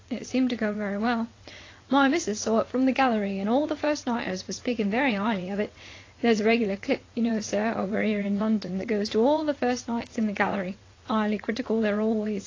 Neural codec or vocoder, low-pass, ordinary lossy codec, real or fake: vocoder, 22.05 kHz, 80 mel bands, WaveNeXt; 7.2 kHz; AAC, 32 kbps; fake